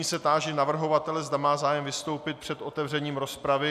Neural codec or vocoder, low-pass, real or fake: none; 14.4 kHz; real